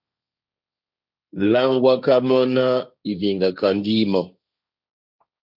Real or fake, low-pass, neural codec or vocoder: fake; 5.4 kHz; codec, 16 kHz, 1.1 kbps, Voila-Tokenizer